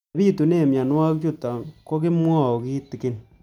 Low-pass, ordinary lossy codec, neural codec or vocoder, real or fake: 19.8 kHz; none; none; real